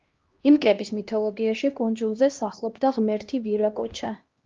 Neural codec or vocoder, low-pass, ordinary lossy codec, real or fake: codec, 16 kHz, 1 kbps, X-Codec, HuBERT features, trained on LibriSpeech; 7.2 kHz; Opus, 32 kbps; fake